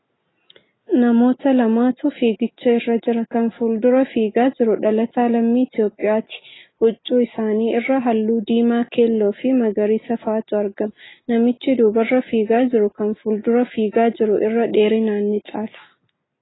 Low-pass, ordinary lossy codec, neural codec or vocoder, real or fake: 7.2 kHz; AAC, 16 kbps; none; real